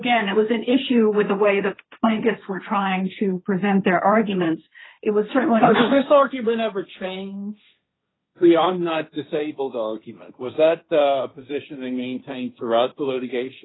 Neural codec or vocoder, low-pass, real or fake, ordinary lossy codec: codec, 16 kHz, 1.1 kbps, Voila-Tokenizer; 7.2 kHz; fake; AAC, 16 kbps